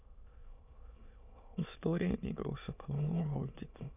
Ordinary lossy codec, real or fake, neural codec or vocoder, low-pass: none; fake; autoencoder, 22.05 kHz, a latent of 192 numbers a frame, VITS, trained on many speakers; 3.6 kHz